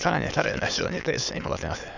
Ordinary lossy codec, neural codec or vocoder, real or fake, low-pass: none; autoencoder, 22.05 kHz, a latent of 192 numbers a frame, VITS, trained on many speakers; fake; 7.2 kHz